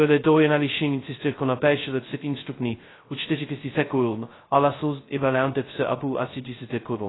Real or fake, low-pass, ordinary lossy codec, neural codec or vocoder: fake; 7.2 kHz; AAC, 16 kbps; codec, 16 kHz, 0.2 kbps, FocalCodec